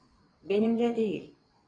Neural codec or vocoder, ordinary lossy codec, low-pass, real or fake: vocoder, 22.05 kHz, 80 mel bands, WaveNeXt; AAC, 32 kbps; 9.9 kHz; fake